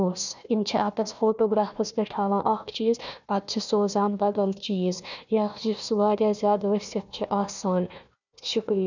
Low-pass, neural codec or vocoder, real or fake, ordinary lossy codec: 7.2 kHz; codec, 16 kHz, 1 kbps, FunCodec, trained on Chinese and English, 50 frames a second; fake; none